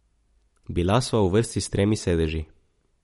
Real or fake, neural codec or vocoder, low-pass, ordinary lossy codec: real; none; 19.8 kHz; MP3, 48 kbps